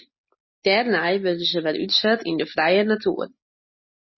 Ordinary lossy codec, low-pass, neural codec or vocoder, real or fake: MP3, 24 kbps; 7.2 kHz; none; real